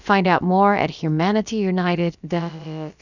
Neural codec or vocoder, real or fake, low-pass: codec, 16 kHz, about 1 kbps, DyCAST, with the encoder's durations; fake; 7.2 kHz